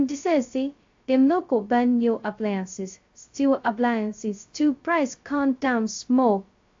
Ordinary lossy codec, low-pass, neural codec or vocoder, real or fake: AAC, 48 kbps; 7.2 kHz; codec, 16 kHz, 0.2 kbps, FocalCodec; fake